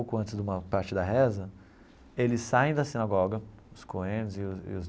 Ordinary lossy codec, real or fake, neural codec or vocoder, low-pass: none; real; none; none